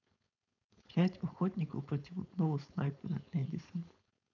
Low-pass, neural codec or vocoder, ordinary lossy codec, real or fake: 7.2 kHz; codec, 16 kHz, 4.8 kbps, FACodec; none; fake